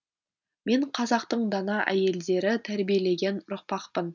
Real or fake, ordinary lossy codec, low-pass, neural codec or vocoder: real; none; 7.2 kHz; none